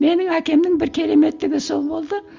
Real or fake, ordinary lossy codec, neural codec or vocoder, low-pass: real; Opus, 32 kbps; none; 7.2 kHz